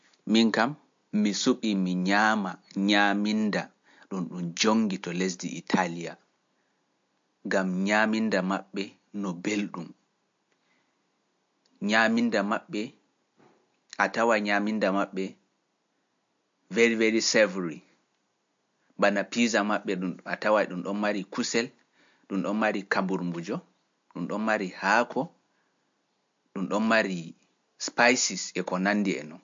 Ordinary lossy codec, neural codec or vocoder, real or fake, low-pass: MP3, 48 kbps; none; real; 7.2 kHz